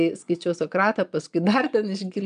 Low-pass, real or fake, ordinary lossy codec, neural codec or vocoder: 9.9 kHz; fake; MP3, 96 kbps; vocoder, 22.05 kHz, 80 mel bands, Vocos